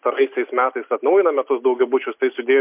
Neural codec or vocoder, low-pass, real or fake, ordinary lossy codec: none; 3.6 kHz; real; MP3, 32 kbps